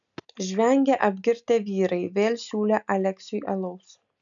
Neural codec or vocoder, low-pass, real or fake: none; 7.2 kHz; real